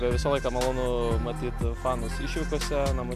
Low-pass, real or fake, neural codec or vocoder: 14.4 kHz; real; none